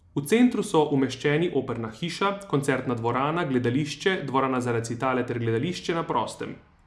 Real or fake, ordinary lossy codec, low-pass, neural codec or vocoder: real; none; none; none